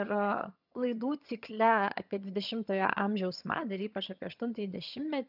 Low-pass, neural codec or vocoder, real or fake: 5.4 kHz; vocoder, 22.05 kHz, 80 mel bands, HiFi-GAN; fake